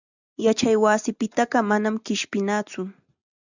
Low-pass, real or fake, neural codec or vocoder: 7.2 kHz; real; none